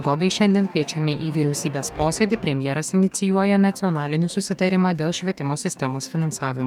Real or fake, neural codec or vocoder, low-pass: fake; codec, 44.1 kHz, 2.6 kbps, DAC; 19.8 kHz